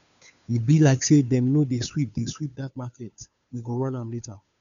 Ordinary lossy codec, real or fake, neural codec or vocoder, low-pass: MP3, 96 kbps; fake; codec, 16 kHz, 2 kbps, FunCodec, trained on Chinese and English, 25 frames a second; 7.2 kHz